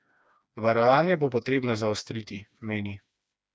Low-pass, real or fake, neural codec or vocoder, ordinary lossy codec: none; fake; codec, 16 kHz, 2 kbps, FreqCodec, smaller model; none